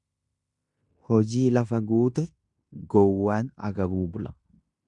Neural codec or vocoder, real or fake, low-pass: codec, 16 kHz in and 24 kHz out, 0.9 kbps, LongCat-Audio-Codec, fine tuned four codebook decoder; fake; 10.8 kHz